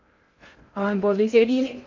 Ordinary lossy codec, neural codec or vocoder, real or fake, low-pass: MP3, 48 kbps; codec, 16 kHz in and 24 kHz out, 0.6 kbps, FocalCodec, streaming, 2048 codes; fake; 7.2 kHz